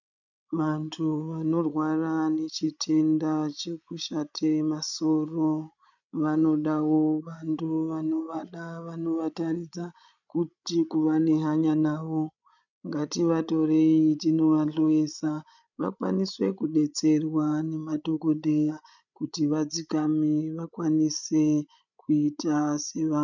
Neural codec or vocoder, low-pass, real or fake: codec, 16 kHz, 16 kbps, FreqCodec, larger model; 7.2 kHz; fake